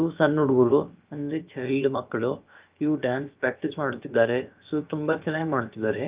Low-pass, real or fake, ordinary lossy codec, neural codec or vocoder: 3.6 kHz; fake; Opus, 32 kbps; codec, 16 kHz, about 1 kbps, DyCAST, with the encoder's durations